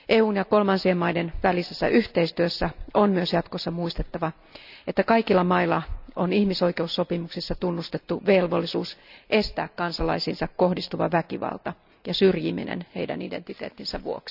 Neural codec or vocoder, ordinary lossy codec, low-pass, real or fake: none; none; 5.4 kHz; real